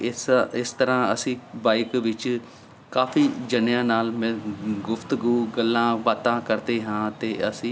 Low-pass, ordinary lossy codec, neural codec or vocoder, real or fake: none; none; none; real